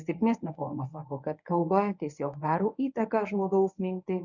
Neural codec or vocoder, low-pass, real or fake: codec, 24 kHz, 0.9 kbps, WavTokenizer, medium speech release version 1; 7.2 kHz; fake